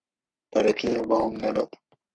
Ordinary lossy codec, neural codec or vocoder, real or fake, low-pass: Opus, 64 kbps; codec, 44.1 kHz, 3.4 kbps, Pupu-Codec; fake; 9.9 kHz